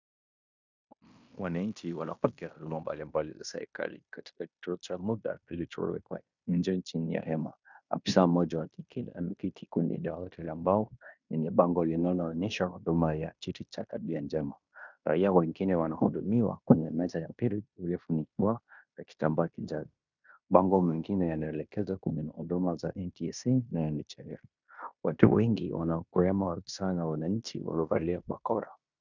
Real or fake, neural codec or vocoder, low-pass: fake; codec, 16 kHz in and 24 kHz out, 0.9 kbps, LongCat-Audio-Codec, fine tuned four codebook decoder; 7.2 kHz